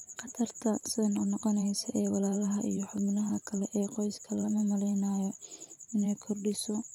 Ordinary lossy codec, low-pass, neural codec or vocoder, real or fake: none; 19.8 kHz; vocoder, 44.1 kHz, 128 mel bands every 512 samples, BigVGAN v2; fake